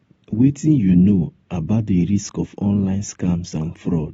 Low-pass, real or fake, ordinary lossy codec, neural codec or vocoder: 14.4 kHz; real; AAC, 24 kbps; none